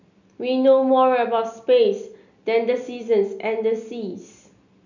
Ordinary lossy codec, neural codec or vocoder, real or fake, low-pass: none; none; real; 7.2 kHz